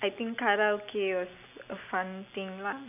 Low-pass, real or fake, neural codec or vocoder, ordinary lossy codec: 3.6 kHz; real; none; none